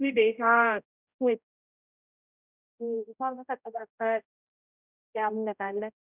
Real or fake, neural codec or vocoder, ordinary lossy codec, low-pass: fake; codec, 16 kHz, 0.5 kbps, X-Codec, HuBERT features, trained on general audio; none; 3.6 kHz